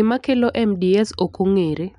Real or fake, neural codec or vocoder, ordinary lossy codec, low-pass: real; none; none; 10.8 kHz